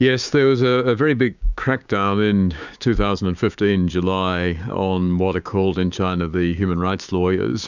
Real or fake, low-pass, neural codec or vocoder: fake; 7.2 kHz; codec, 16 kHz, 6 kbps, DAC